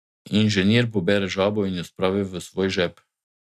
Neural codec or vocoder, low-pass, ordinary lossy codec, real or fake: none; 14.4 kHz; none; real